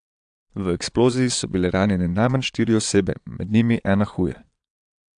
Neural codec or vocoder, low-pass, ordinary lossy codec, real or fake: vocoder, 22.05 kHz, 80 mel bands, Vocos; 9.9 kHz; AAC, 64 kbps; fake